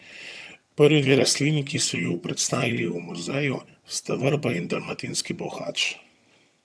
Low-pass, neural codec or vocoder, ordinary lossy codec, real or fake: none; vocoder, 22.05 kHz, 80 mel bands, HiFi-GAN; none; fake